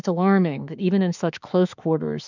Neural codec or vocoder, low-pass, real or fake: autoencoder, 48 kHz, 32 numbers a frame, DAC-VAE, trained on Japanese speech; 7.2 kHz; fake